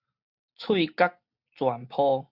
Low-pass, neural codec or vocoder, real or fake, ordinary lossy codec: 5.4 kHz; none; real; Opus, 64 kbps